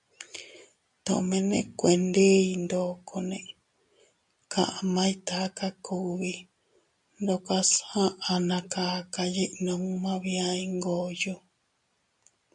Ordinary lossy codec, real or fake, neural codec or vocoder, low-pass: MP3, 96 kbps; real; none; 10.8 kHz